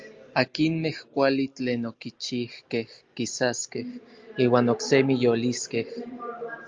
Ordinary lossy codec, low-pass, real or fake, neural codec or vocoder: Opus, 32 kbps; 7.2 kHz; real; none